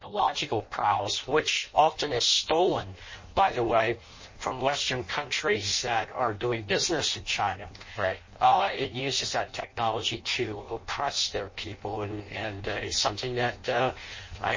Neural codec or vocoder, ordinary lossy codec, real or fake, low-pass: codec, 16 kHz in and 24 kHz out, 0.6 kbps, FireRedTTS-2 codec; MP3, 32 kbps; fake; 7.2 kHz